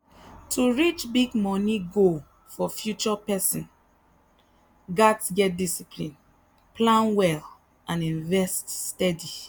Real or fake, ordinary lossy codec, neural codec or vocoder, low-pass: real; none; none; none